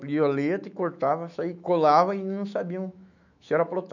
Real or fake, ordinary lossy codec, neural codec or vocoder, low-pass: fake; none; autoencoder, 48 kHz, 128 numbers a frame, DAC-VAE, trained on Japanese speech; 7.2 kHz